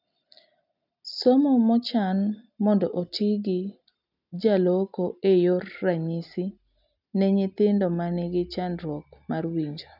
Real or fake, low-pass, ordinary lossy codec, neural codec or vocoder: real; 5.4 kHz; none; none